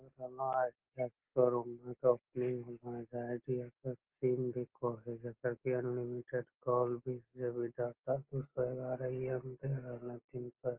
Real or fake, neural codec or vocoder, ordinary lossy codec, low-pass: real; none; Opus, 16 kbps; 3.6 kHz